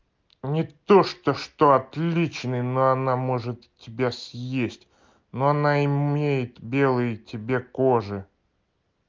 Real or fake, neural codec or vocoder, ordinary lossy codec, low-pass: real; none; Opus, 32 kbps; 7.2 kHz